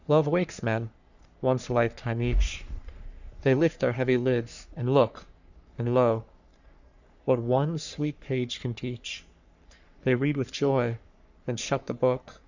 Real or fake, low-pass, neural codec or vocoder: fake; 7.2 kHz; codec, 44.1 kHz, 3.4 kbps, Pupu-Codec